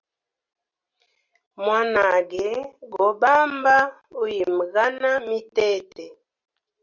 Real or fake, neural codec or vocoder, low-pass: real; none; 7.2 kHz